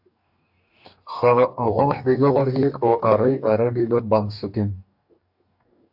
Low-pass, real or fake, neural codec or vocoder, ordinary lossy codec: 5.4 kHz; fake; codec, 44.1 kHz, 2.6 kbps, DAC; AAC, 48 kbps